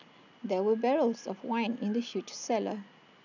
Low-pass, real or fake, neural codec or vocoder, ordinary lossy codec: 7.2 kHz; real; none; none